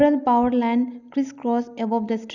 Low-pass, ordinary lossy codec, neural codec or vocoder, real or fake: 7.2 kHz; none; none; real